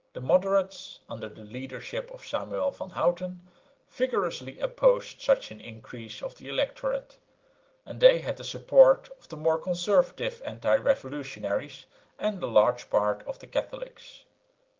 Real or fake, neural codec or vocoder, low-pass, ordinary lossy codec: real; none; 7.2 kHz; Opus, 16 kbps